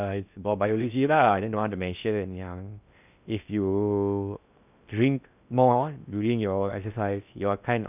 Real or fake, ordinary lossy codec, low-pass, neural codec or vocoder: fake; none; 3.6 kHz; codec, 16 kHz in and 24 kHz out, 0.6 kbps, FocalCodec, streaming, 2048 codes